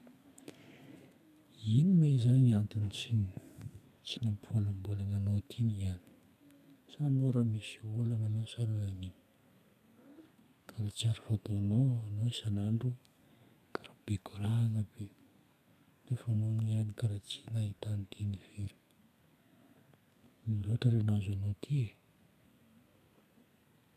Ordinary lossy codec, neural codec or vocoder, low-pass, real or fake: none; codec, 32 kHz, 1.9 kbps, SNAC; 14.4 kHz; fake